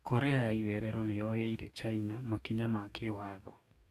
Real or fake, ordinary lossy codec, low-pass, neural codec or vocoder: fake; none; 14.4 kHz; codec, 44.1 kHz, 2.6 kbps, DAC